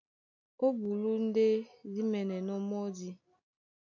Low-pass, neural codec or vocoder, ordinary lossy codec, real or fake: 7.2 kHz; none; AAC, 48 kbps; real